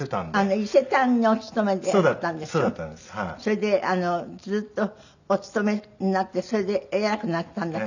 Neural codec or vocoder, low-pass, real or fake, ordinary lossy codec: none; 7.2 kHz; real; none